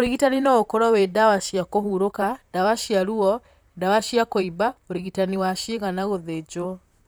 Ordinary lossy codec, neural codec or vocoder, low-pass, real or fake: none; vocoder, 44.1 kHz, 128 mel bands, Pupu-Vocoder; none; fake